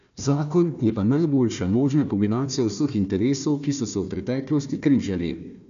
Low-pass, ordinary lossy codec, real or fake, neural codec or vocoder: 7.2 kHz; none; fake; codec, 16 kHz, 1 kbps, FunCodec, trained on Chinese and English, 50 frames a second